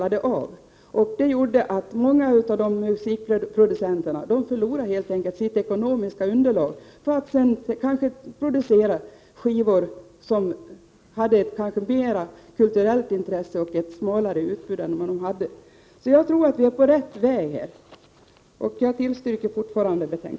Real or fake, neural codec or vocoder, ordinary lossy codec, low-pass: real; none; none; none